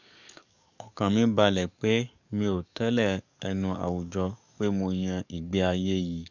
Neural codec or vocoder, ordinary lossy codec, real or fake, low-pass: codec, 44.1 kHz, 7.8 kbps, DAC; none; fake; 7.2 kHz